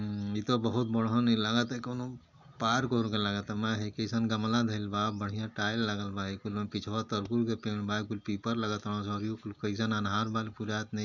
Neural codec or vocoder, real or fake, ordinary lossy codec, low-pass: none; real; none; 7.2 kHz